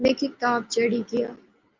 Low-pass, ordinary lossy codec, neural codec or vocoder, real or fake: 7.2 kHz; Opus, 24 kbps; none; real